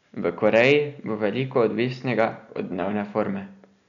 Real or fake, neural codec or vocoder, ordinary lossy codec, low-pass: real; none; none; 7.2 kHz